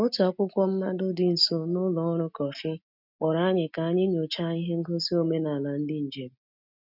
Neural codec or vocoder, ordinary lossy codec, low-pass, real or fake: none; none; 5.4 kHz; real